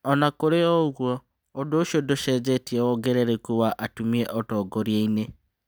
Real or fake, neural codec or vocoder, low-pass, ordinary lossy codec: real; none; none; none